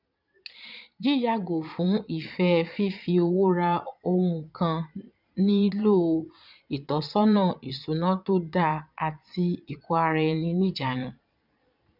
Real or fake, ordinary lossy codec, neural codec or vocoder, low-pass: fake; none; vocoder, 22.05 kHz, 80 mel bands, Vocos; 5.4 kHz